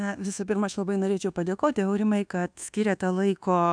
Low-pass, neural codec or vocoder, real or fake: 9.9 kHz; codec, 24 kHz, 1.2 kbps, DualCodec; fake